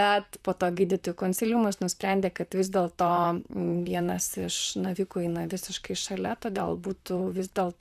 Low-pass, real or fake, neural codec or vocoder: 14.4 kHz; fake; vocoder, 44.1 kHz, 128 mel bands, Pupu-Vocoder